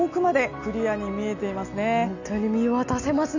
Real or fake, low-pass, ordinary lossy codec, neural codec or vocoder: real; 7.2 kHz; none; none